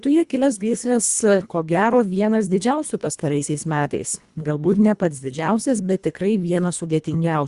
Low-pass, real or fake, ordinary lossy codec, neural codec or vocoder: 10.8 kHz; fake; Opus, 64 kbps; codec, 24 kHz, 1.5 kbps, HILCodec